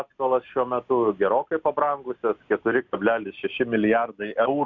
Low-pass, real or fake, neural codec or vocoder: 7.2 kHz; real; none